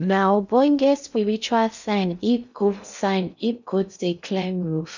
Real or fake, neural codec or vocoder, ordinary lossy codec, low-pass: fake; codec, 16 kHz in and 24 kHz out, 0.6 kbps, FocalCodec, streaming, 2048 codes; none; 7.2 kHz